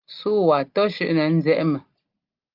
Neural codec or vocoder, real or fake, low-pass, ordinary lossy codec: none; real; 5.4 kHz; Opus, 24 kbps